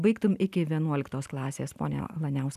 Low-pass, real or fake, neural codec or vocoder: 14.4 kHz; real; none